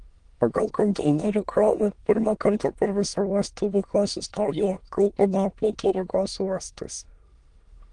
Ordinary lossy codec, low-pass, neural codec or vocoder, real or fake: Opus, 24 kbps; 9.9 kHz; autoencoder, 22.05 kHz, a latent of 192 numbers a frame, VITS, trained on many speakers; fake